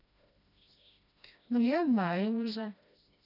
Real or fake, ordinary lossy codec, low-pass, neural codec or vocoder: fake; none; 5.4 kHz; codec, 16 kHz, 1 kbps, FreqCodec, smaller model